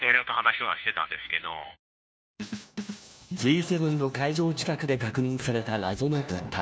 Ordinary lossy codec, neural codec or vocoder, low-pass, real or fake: none; codec, 16 kHz, 1 kbps, FunCodec, trained on LibriTTS, 50 frames a second; none; fake